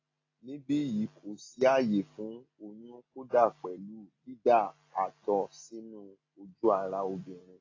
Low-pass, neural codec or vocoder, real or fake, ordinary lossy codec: 7.2 kHz; none; real; AAC, 32 kbps